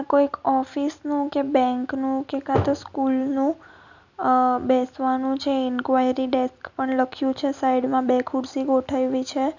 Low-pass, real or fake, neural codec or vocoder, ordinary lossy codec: 7.2 kHz; real; none; none